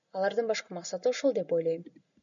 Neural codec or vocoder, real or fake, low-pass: none; real; 7.2 kHz